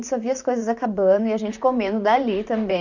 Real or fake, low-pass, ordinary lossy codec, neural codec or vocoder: fake; 7.2 kHz; none; vocoder, 44.1 kHz, 128 mel bands, Pupu-Vocoder